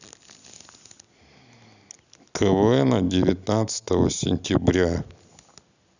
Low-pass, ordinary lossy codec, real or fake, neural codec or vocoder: 7.2 kHz; none; real; none